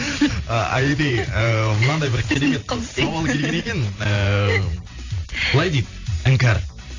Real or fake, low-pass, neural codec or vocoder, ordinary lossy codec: fake; 7.2 kHz; vocoder, 44.1 kHz, 128 mel bands every 512 samples, BigVGAN v2; AAC, 48 kbps